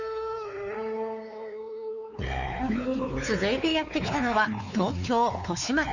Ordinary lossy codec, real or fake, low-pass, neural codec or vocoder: none; fake; 7.2 kHz; codec, 16 kHz, 4 kbps, X-Codec, WavLM features, trained on Multilingual LibriSpeech